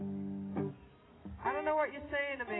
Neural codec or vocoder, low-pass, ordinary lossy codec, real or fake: none; 7.2 kHz; AAC, 16 kbps; real